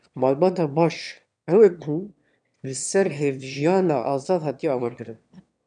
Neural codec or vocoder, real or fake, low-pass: autoencoder, 22.05 kHz, a latent of 192 numbers a frame, VITS, trained on one speaker; fake; 9.9 kHz